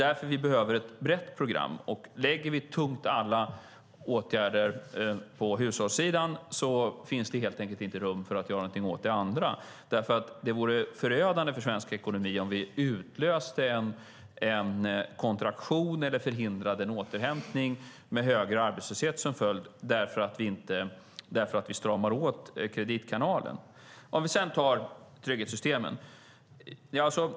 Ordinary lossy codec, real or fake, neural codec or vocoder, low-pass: none; real; none; none